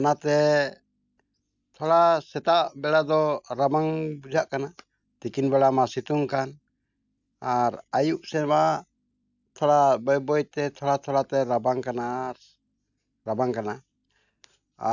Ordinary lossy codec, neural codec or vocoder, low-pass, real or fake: none; none; 7.2 kHz; real